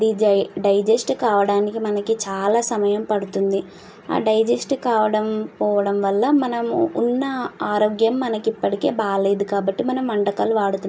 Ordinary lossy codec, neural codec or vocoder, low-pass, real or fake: none; none; none; real